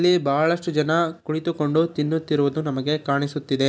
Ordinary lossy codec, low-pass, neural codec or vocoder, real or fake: none; none; none; real